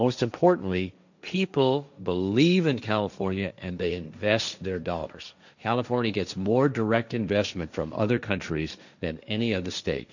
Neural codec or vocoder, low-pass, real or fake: codec, 16 kHz, 1.1 kbps, Voila-Tokenizer; 7.2 kHz; fake